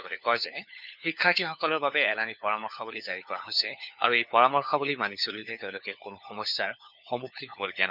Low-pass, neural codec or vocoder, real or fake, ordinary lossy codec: 5.4 kHz; codec, 16 kHz, 4 kbps, FunCodec, trained on LibriTTS, 50 frames a second; fake; none